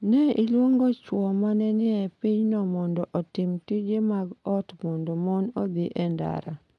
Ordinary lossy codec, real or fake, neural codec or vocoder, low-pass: none; real; none; none